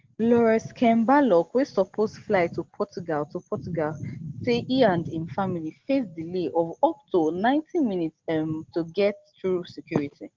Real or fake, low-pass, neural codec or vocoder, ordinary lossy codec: real; 7.2 kHz; none; Opus, 16 kbps